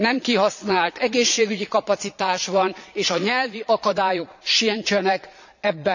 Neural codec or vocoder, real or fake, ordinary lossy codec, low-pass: vocoder, 22.05 kHz, 80 mel bands, Vocos; fake; none; 7.2 kHz